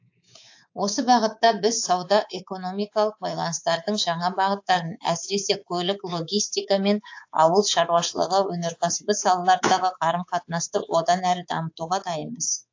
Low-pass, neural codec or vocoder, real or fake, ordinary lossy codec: 7.2 kHz; codec, 24 kHz, 3.1 kbps, DualCodec; fake; AAC, 48 kbps